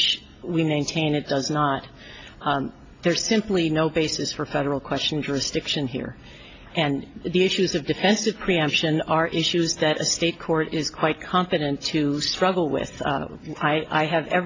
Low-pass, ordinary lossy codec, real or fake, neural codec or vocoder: 7.2 kHz; AAC, 32 kbps; real; none